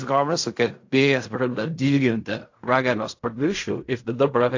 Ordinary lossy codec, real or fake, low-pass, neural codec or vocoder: AAC, 48 kbps; fake; 7.2 kHz; codec, 16 kHz in and 24 kHz out, 0.4 kbps, LongCat-Audio-Codec, fine tuned four codebook decoder